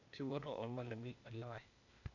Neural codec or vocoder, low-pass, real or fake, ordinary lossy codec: codec, 16 kHz, 0.8 kbps, ZipCodec; 7.2 kHz; fake; none